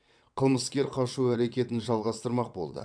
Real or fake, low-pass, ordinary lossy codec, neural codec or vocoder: fake; 9.9 kHz; none; vocoder, 22.05 kHz, 80 mel bands, WaveNeXt